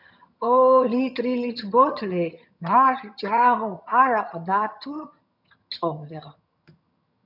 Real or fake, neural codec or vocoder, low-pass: fake; vocoder, 22.05 kHz, 80 mel bands, HiFi-GAN; 5.4 kHz